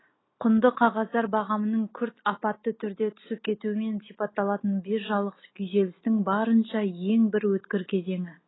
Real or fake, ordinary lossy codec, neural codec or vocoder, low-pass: real; AAC, 16 kbps; none; 7.2 kHz